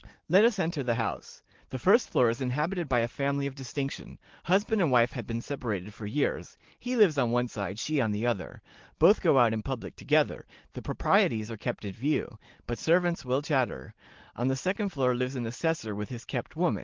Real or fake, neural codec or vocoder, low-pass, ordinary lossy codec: real; none; 7.2 kHz; Opus, 16 kbps